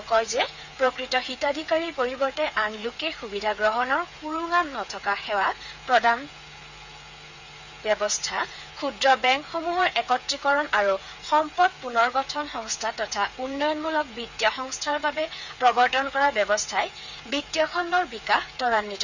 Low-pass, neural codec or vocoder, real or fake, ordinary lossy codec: 7.2 kHz; codec, 16 kHz, 8 kbps, FreqCodec, smaller model; fake; none